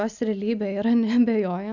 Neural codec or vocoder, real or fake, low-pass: none; real; 7.2 kHz